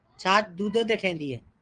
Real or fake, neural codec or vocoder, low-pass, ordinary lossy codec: fake; vocoder, 22.05 kHz, 80 mel bands, WaveNeXt; 9.9 kHz; Opus, 24 kbps